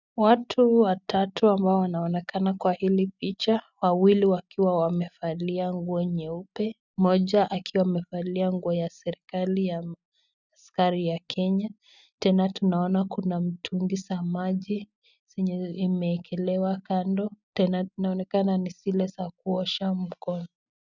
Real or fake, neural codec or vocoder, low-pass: real; none; 7.2 kHz